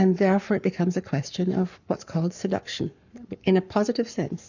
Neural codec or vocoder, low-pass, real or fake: codec, 44.1 kHz, 7.8 kbps, Pupu-Codec; 7.2 kHz; fake